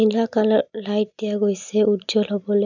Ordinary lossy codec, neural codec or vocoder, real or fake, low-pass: none; none; real; 7.2 kHz